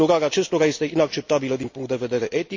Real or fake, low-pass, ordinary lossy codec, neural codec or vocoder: real; 7.2 kHz; MP3, 48 kbps; none